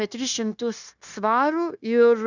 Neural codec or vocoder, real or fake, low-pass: codec, 16 kHz, 0.9 kbps, LongCat-Audio-Codec; fake; 7.2 kHz